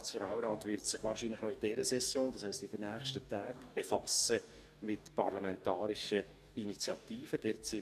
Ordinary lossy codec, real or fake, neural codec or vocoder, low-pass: none; fake; codec, 44.1 kHz, 2.6 kbps, DAC; 14.4 kHz